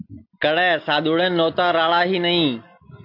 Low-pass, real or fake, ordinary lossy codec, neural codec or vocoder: 5.4 kHz; real; AAC, 32 kbps; none